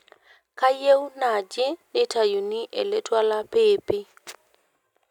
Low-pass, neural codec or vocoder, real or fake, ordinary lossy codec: 19.8 kHz; none; real; none